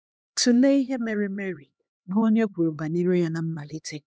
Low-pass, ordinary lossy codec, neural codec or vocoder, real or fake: none; none; codec, 16 kHz, 2 kbps, X-Codec, HuBERT features, trained on LibriSpeech; fake